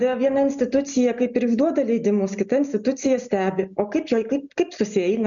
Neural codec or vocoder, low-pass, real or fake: none; 7.2 kHz; real